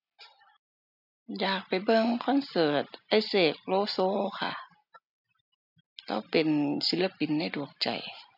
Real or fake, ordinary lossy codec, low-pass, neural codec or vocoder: real; none; 5.4 kHz; none